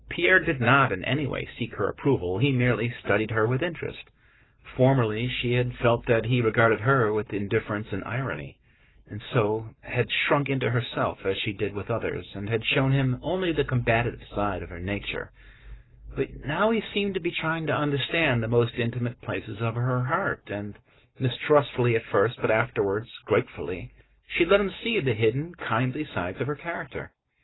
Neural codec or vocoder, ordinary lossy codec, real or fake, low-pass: codec, 44.1 kHz, 7.8 kbps, Pupu-Codec; AAC, 16 kbps; fake; 7.2 kHz